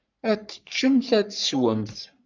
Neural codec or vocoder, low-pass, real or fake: codec, 44.1 kHz, 3.4 kbps, Pupu-Codec; 7.2 kHz; fake